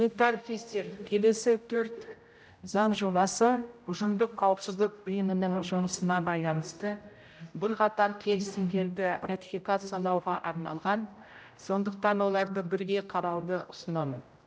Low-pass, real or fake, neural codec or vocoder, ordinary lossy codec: none; fake; codec, 16 kHz, 0.5 kbps, X-Codec, HuBERT features, trained on general audio; none